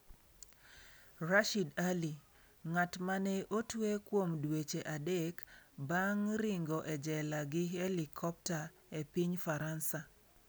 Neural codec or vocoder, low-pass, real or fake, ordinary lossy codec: none; none; real; none